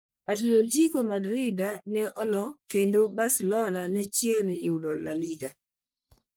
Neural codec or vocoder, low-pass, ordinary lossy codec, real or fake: codec, 44.1 kHz, 1.7 kbps, Pupu-Codec; none; none; fake